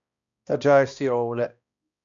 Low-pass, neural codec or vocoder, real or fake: 7.2 kHz; codec, 16 kHz, 1 kbps, X-Codec, HuBERT features, trained on balanced general audio; fake